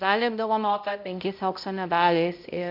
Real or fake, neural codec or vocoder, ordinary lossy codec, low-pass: fake; codec, 16 kHz, 0.5 kbps, X-Codec, HuBERT features, trained on balanced general audio; MP3, 48 kbps; 5.4 kHz